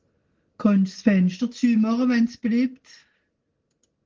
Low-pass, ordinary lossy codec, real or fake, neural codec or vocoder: 7.2 kHz; Opus, 16 kbps; real; none